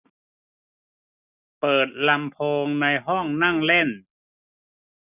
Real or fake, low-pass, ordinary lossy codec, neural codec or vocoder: real; 3.6 kHz; none; none